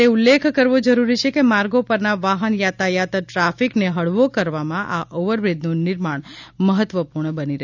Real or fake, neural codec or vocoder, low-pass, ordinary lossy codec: real; none; 7.2 kHz; none